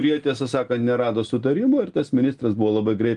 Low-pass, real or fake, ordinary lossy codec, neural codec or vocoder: 10.8 kHz; real; Opus, 24 kbps; none